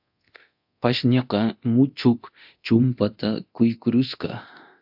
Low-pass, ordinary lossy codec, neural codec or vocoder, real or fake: 5.4 kHz; AAC, 48 kbps; codec, 24 kHz, 0.9 kbps, DualCodec; fake